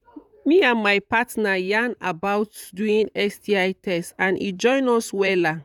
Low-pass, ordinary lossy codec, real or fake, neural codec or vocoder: 19.8 kHz; none; fake; vocoder, 44.1 kHz, 128 mel bands, Pupu-Vocoder